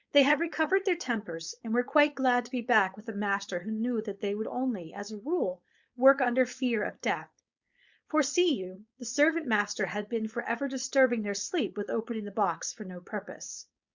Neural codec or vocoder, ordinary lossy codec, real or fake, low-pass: codec, 16 kHz, 4.8 kbps, FACodec; Opus, 64 kbps; fake; 7.2 kHz